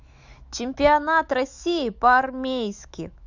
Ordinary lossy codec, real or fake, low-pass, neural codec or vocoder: Opus, 64 kbps; fake; 7.2 kHz; autoencoder, 48 kHz, 128 numbers a frame, DAC-VAE, trained on Japanese speech